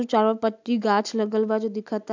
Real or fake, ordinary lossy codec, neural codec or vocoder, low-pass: real; MP3, 64 kbps; none; 7.2 kHz